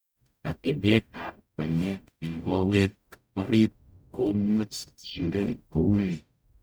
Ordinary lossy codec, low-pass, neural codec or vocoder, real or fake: none; none; codec, 44.1 kHz, 0.9 kbps, DAC; fake